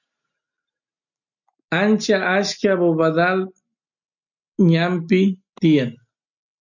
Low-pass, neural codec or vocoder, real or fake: 7.2 kHz; none; real